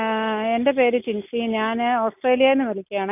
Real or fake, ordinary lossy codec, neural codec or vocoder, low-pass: real; none; none; 3.6 kHz